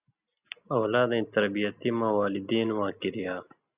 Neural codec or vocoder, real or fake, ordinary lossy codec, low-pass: none; real; Opus, 64 kbps; 3.6 kHz